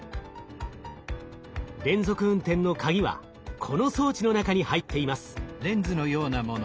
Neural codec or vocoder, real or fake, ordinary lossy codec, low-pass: none; real; none; none